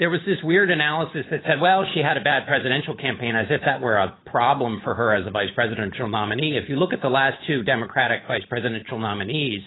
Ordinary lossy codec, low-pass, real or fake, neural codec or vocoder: AAC, 16 kbps; 7.2 kHz; fake; codec, 16 kHz, 8 kbps, FunCodec, trained on Chinese and English, 25 frames a second